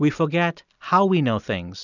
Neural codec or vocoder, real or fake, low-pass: none; real; 7.2 kHz